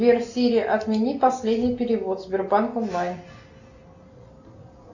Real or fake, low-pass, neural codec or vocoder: real; 7.2 kHz; none